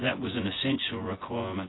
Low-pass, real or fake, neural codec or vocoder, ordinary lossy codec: 7.2 kHz; fake; vocoder, 24 kHz, 100 mel bands, Vocos; AAC, 16 kbps